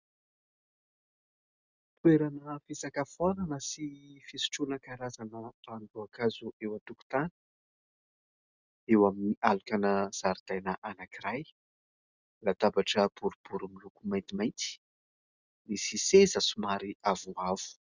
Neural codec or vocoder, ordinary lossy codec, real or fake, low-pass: none; Opus, 64 kbps; real; 7.2 kHz